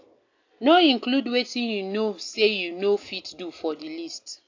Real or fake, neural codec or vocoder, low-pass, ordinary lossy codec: real; none; 7.2 kHz; AAC, 48 kbps